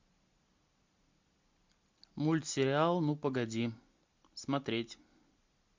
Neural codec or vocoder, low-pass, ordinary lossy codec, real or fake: none; 7.2 kHz; MP3, 64 kbps; real